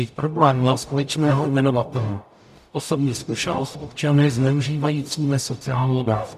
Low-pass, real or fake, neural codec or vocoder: 14.4 kHz; fake; codec, 44.1 kHz, 0.9 kbps, DAC